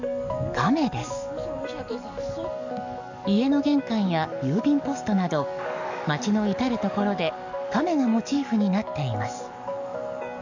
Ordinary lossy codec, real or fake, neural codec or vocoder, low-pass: none; fake; codec, 44.1 kHz, 7.8 kbps, DAC; 7.2 kHz